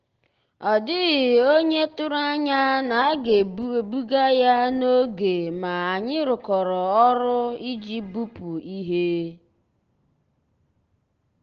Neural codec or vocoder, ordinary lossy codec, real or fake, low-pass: none; Opus, 16 kbps; real; 7.2 kHz